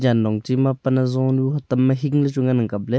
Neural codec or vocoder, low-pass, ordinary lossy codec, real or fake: none; none; none; real